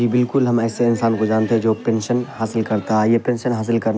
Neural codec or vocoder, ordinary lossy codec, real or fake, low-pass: none; none; real; none